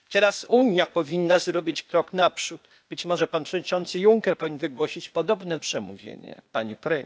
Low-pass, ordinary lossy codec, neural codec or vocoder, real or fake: none; none; codec, 16 kHz, 0.8 kbps, ZipCodec; fake